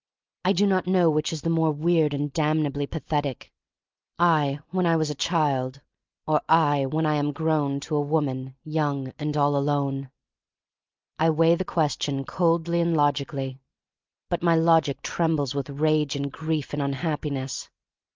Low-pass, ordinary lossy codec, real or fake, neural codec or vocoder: 7.2 kHz; Opus, 24 kbps; real; none